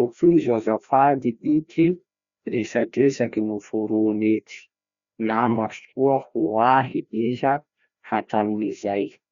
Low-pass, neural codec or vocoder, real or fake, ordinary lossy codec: 7.2 kHz; codec, 16 kHz, 1 kbps, FreqCodec, larger model; fake; Opus, 64 kbps